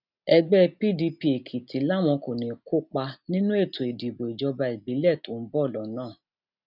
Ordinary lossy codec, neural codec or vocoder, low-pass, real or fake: none; none; 5.4 kHz; real